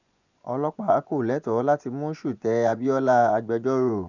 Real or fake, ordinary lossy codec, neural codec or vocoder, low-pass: fake; none; vocoder, 24 kHz, 100 mel bands, Vocos; 7.2 kHz